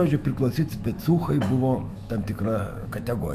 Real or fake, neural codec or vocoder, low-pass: fake; autoencoder, 48 kHz, 128 numbers a frame, DAC-VAE, trained on Japanese speech; 14.4 kHz